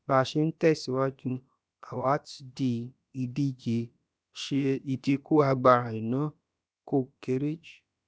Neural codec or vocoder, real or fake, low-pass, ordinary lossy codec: codec, 16 kHz, about 1 kbps, DyCAST, with the encoder's durations; fake; none; none